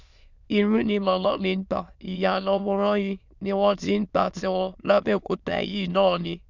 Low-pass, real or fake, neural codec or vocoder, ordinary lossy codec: 7.2 kHz; fake; autoencoder, 22.05 kHz, a latent of 192 numbers a frame, VITS, trained on many speakers; none